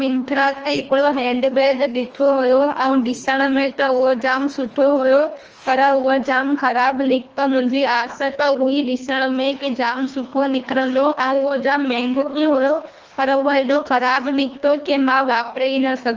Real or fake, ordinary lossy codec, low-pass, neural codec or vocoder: fake; Opus, 24 kbps; 7.2 kHz; codec, 24 kHz, 1.5 kbps, HILCodec